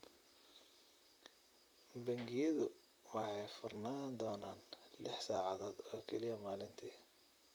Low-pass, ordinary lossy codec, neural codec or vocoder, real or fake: none; none; vocoder, 44.1 kHz, 128 mel bands, Pupu-Vocoder; fake